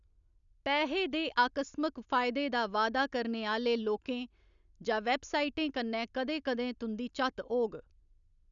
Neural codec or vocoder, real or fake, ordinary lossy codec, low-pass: none; real; none; 7.2 kHz